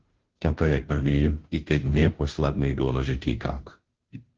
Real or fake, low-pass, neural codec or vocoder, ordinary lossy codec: fake; 7.2 kHz; codec, 16 kHz, 0.5 kbps, FunCodec, trained on Chinese and English, 25 frames a second; Opus, 16 kbps